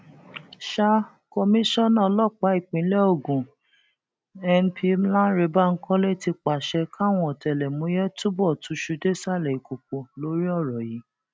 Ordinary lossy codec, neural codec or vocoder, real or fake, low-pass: none; none; real; none